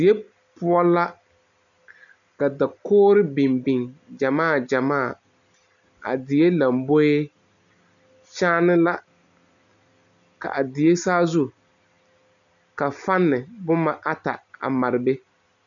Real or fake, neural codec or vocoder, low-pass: real; none; 7.2 kHz